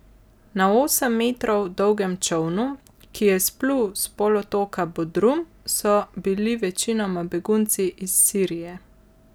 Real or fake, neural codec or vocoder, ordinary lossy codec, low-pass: real; none; none; none